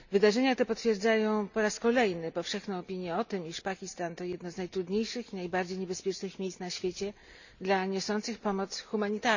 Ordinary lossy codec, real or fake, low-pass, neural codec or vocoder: none; real; 7.2 kHz; none